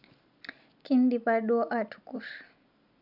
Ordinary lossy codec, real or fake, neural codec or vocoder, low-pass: none; real; none; 5.4 kHz